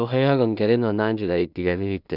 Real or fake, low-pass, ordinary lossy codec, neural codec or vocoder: fake; 5.4 kHz; none; codec, 16 kHz in and 24 kHz out, 0.4 kbps, LongCat-Audio-Codec, two codebook decoder